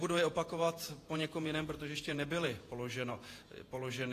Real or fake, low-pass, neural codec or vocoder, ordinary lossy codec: fake; 14.4 kHz; vocoder, 48 kHz, 128 mel bands, Vocos; AAC, 48 kbps